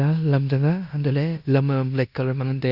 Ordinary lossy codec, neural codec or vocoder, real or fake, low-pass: Opus, 64 kbps; codec, 16 kHz in and 24 kHz out, 0.9 kbps, LongCat-Audio-Codec, fine tuned four codebook decoder; fake; 5.4 kHz